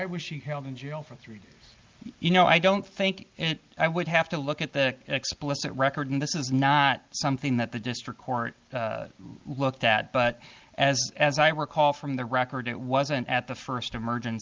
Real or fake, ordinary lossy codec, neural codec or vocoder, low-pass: real; Opus, 32 kbps; none; 7.2 kHz